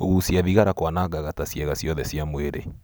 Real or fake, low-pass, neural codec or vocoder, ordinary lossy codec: real; none; none; none